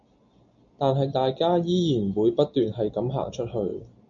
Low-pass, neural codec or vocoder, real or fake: 7.2 kHz; none; real